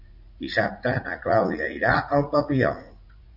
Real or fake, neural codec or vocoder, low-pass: fake; vocoder, 44.1 kHz, 80 mel bands, Vocos; 5.4 kHz